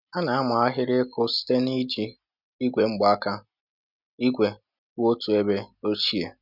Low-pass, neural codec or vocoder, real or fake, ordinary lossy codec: 5.4 kHz; none; real; none